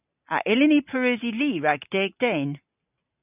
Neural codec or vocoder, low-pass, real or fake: none; 3.6 kHz; real